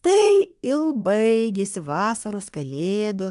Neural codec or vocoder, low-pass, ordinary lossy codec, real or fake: codec, 24 kHz, 1 kbps, SNAC; 10.8 kHz; AAC, 96 kbps; fake